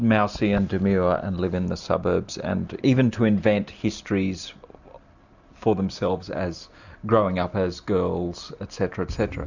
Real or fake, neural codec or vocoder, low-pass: real; none; 7.2 kHz